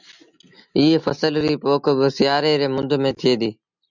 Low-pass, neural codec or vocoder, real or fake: 7.2 kHz; none; real